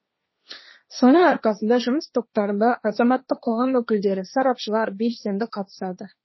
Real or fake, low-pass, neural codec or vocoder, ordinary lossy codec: fake; 7.2 kHz; codec, 16 kHz, 1.1 kbps, Voila-Tokenizer; MP3, 24 kbps